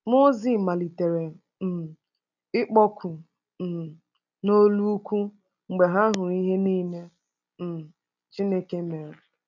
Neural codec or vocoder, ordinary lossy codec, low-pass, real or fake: none; none; 7.2 kHz; real